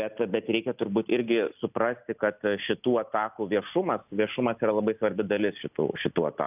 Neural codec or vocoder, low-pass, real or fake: none; 3.6 kHz; real